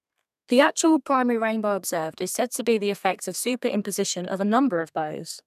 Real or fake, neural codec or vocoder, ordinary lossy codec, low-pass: fake; codec, 32 kHz, 1.9 kbps, SNAC; none; 14.4 kHz